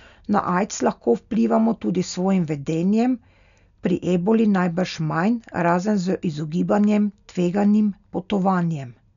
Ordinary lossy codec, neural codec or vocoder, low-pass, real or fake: none; none; 7.2 kHz; real